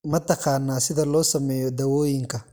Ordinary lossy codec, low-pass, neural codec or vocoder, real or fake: none; none; none; real